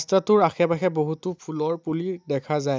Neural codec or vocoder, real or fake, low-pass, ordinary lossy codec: none; real; none; none